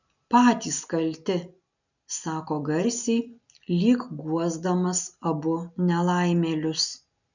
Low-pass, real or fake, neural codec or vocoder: 7.2 kHz; real; none